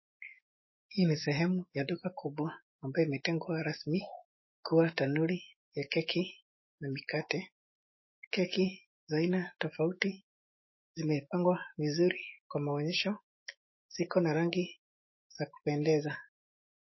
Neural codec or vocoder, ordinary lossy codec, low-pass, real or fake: autoencoder, 48 kHz, 128 numbers a frame, DAC-VAE, trained on Japanese speech; MP3, 24 kbps; 7.2 kHz; fake